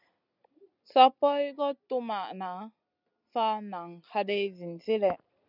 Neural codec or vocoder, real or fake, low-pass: none; real; 5.4 kHz